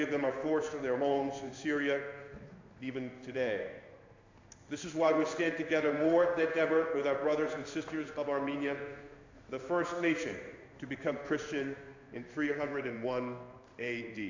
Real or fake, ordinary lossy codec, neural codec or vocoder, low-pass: fake; AAC, 48 kbps; codec, 16 kHz in and 24 kHz out, 1 kbps, XY-Tokenizer; 7.2 kHz